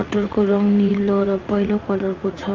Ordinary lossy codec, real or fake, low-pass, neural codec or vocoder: Opus, 32 kbps; fake; 7.2 kHz; vocoder, 44.1 kHz, 80 mel bands, Vocos